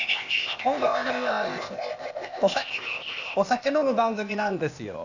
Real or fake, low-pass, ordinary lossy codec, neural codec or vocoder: fake; 7.2 kHz; none; codec, 16 kHz, 0.8 kbps, ZipCodec